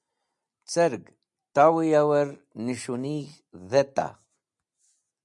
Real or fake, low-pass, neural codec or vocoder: real; 10.8 kHz; none